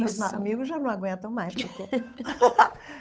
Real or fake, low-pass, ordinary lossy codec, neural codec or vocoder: fake; none; none; codec, 16 kHz, 8 kbps, FunCodec, trained on Chinese and English, 25 frames a second